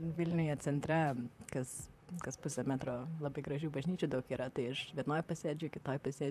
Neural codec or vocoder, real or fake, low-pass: vocoder, 44.1 kHz, 128 mel bands, Pupu-Vocoder; fake; 14.4 kHz